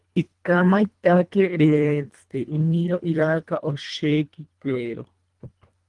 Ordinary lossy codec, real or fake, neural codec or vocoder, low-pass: Opus, 24 kbps; fake; codec, 24 kHz, 1.5 kbps, HILCodec; 10.8 kHz